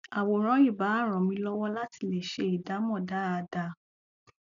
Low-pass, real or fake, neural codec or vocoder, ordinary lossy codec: 7.2 kHz; real; none; none